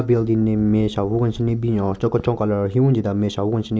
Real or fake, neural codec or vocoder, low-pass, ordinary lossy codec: real; none; none; none